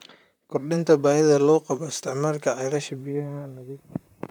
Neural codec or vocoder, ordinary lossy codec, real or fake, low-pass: vocoder, 44.1 kHz, 128 mel bands, Pupu-Vocoder; none; fake; 19.8 kHz